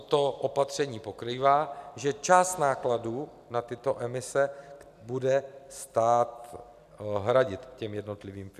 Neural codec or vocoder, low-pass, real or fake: none; 14.4 kHz; real